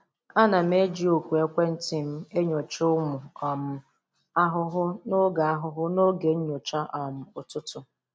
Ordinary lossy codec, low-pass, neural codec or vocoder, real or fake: none; none; none; real